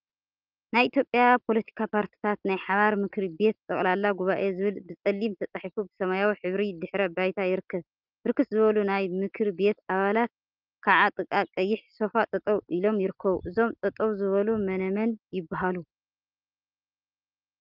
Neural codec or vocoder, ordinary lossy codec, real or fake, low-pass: none; Opus, 24 kbps; real; 5.4 kHz